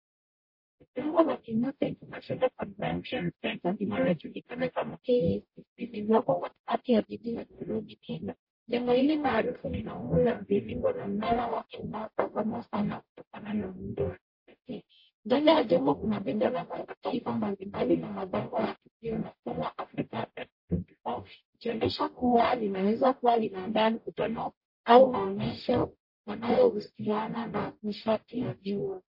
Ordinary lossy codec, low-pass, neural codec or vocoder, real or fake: MP3, 32 kbps; 5.4 kHz; codec, 44.1 kHz, 0.9 kbps, DAC; fake